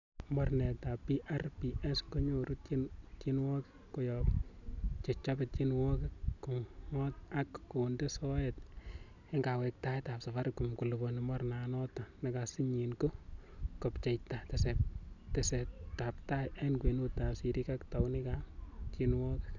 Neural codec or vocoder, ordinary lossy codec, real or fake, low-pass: none; none; real; 7.2 kHz